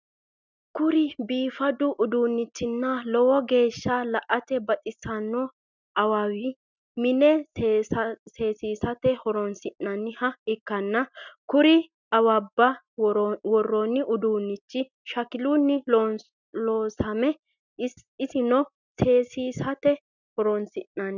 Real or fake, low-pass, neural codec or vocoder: real; 7.2 kHz; none